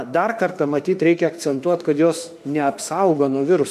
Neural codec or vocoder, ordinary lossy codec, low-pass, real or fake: autoencoder, 48 kHz, 32 numbers a frame, DAC-VAE, trained on Japanese speech; MP3, 96 kbps; 14.4 kHz; fake